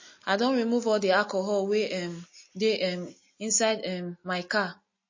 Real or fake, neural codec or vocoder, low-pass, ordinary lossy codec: real; none; 7.2 kHz; MP3, 32 kbps